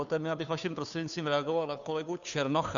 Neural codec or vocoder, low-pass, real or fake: codec, 16 kHz, 2 kbps, FunCodec, trained on Chinese and English, 25 frames a second; 7.2 kHz; fake